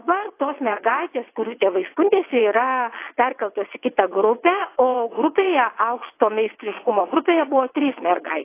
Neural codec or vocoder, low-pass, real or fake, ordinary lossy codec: vocoder, 22.05 kHz, 80 mel bands, WaveNeXt; 3.6 kHz; fake; AAC, 24 kbps